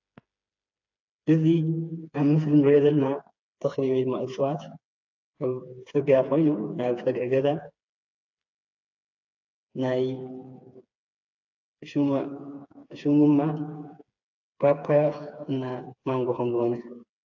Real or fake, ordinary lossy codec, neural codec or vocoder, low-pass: fake; AAC, 48 kbps; codec, 16 kHz, 4 kbps, FreqCodec, smaller model; 7.2 kHz